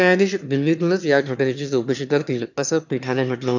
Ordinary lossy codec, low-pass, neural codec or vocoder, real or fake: none; 7.2 kHz; autoencoder, 22.05 kHz, a latent of 192 numbers a frame, VITS, trained on one speaker; fake